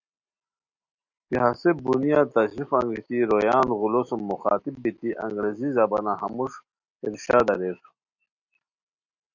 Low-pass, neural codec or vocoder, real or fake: 7.2 kHz; none; real